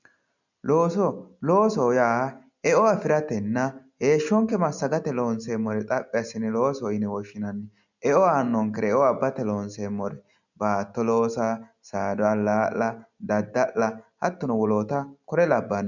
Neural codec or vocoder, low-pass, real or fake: none; 7.2 kHz; real